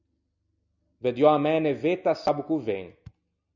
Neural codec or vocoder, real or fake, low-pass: none; real; 7.2 kHz